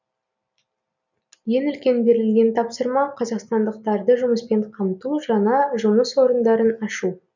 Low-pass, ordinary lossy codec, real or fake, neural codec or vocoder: 7.2 kHz; none; real; none